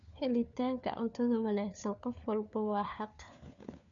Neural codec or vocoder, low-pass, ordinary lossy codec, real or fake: codec, 16 kHz, 4 kbps, FunCodec, trained on LibriTTS, 50 frames a second; 7.2 kHz; none; fake